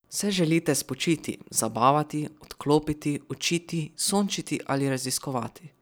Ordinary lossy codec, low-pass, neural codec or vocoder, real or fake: none; none; vocoder, 44.1 kHz, 128 mel bands every 256 samples, BigVGAN v2; fake